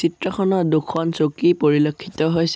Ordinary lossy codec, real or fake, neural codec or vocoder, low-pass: none; real; none; none